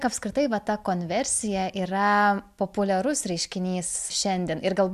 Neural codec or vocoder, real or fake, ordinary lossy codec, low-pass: none; real; AAC, 96 kbps; 14.4 kHz